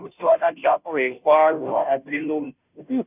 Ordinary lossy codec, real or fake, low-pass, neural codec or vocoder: none; fake; 3.6 kHz; codec, 16 kHz, 0.5 kbps, FunCodec, trained on Chinese and English, 25 frames a second